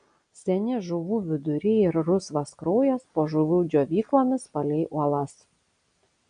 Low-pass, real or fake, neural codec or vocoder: 9.9 kHz; real; none